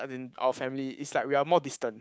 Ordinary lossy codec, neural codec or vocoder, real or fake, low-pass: none; codec, 16 kHz, 6 kbps, DAC; fake; none